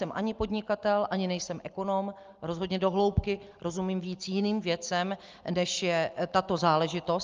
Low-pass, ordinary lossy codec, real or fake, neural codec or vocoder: 7.2 kHz; Opus, 32 kbps; real; none